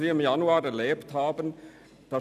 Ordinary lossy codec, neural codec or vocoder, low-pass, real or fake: none; none; 14.4 kHz; real